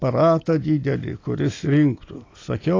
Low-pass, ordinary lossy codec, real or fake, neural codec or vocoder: 7.2 kHz; AAC, 32 kbps; real; none